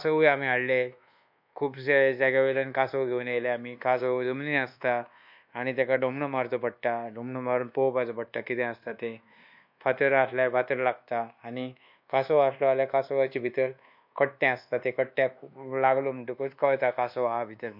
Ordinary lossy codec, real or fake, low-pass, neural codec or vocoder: MP3, 48 kbps; fake; 5.4 kHz; codec, 24 kHz, 1.2 kbps, DualCodec